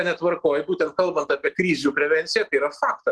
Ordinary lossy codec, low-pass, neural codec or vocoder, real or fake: Opus, 16 kbps; 10.8 kHz; none; real